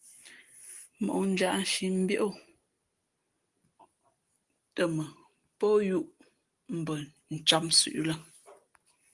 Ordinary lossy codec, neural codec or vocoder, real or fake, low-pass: Opus, 24 kbps; none; real; 10.8 kHz